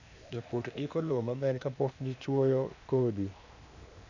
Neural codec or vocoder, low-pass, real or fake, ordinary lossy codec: codec, 16 kHz, 0.8 kbps, ZipCodec; 7.2 kHz; fake; none